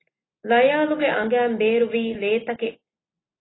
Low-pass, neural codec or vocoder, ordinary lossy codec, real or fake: 7.2 kHz; none; AAC, 16 kbps; real